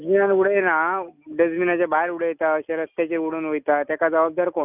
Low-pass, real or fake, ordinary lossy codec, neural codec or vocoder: 3.6 kHz; real; none; none